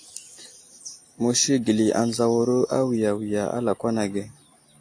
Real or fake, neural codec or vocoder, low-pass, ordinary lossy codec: real; none; 9.9 kHz; AAC, 48 kbps